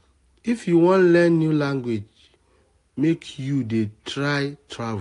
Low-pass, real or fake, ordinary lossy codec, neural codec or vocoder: 10.8 kHz; real; AAC, 48 kbps; none